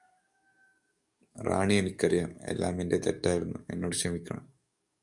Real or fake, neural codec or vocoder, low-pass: fake; codec, 44.1 kHz, 7.8 kbps, DAC; 10.8 kHz